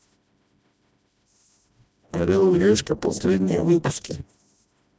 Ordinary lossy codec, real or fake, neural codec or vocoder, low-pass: none; fake; codec, 16 kHz, 1 kbps, FreqCodec, smaller model; none